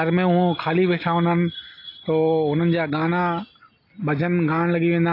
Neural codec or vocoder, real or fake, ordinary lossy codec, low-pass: none; real; AAC, 32 kbps; 5.4 kHz